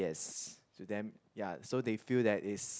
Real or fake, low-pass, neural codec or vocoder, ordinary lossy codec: real; none; none; none